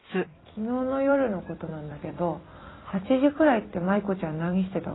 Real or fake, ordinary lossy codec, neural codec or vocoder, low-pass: fake; AAC, 16 kbps; vocoder, 44.1 kHz, 128 mel bands every 256 samples, BigVGAN v2; 7.2 kHz